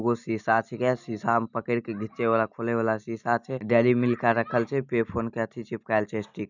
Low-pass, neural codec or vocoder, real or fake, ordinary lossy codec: 7.2 kHz; none; real; none